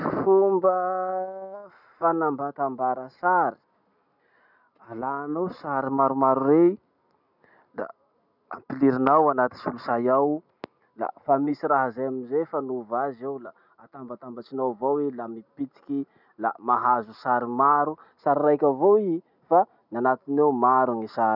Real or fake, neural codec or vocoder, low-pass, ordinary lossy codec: real; none; 5.4 kHz; none